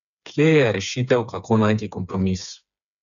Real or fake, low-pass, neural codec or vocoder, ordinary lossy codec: fake; 7.2 kHz; codec, 16 kHz, 4 kbps, FreqCodec, smaller model; none